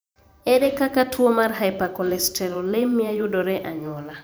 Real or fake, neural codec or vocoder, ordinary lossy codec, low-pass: fake; vocoder, 44.1 kHz, 128 mel bands every 512 samples, BigVGAN v2; none; none